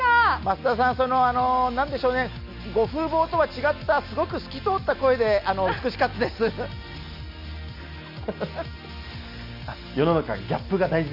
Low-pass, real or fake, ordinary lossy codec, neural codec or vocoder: 5.4 kHz; real; none; none